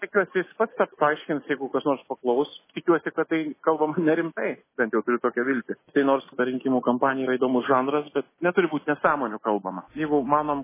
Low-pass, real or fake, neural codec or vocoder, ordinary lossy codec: 3.6 kHz; real; none; MP3, 16 kbps